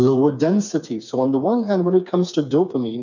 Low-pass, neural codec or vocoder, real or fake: 7.2 kHz; codec, 16 kHz, 4 kbps, FreqCodec, smaller model; fake